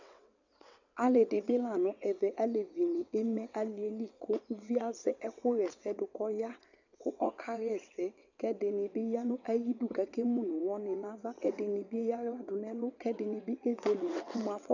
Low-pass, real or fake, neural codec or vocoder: 7.2 kHz; fake; vocoder, 22.05 kHz, 80 mel bands, WaveNeXt